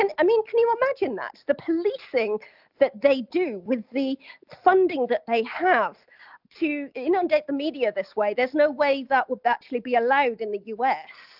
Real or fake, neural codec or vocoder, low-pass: real; none; 5.4 kHz